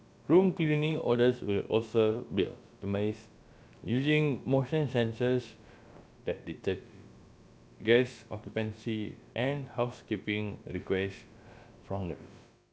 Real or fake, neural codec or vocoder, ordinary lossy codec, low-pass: fake; codec, 16 kHz, about 1 kbps, DyCAST, with the encoder's durations; none; none